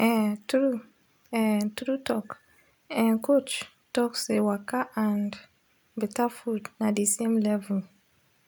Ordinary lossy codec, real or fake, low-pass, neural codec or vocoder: none; real; none; none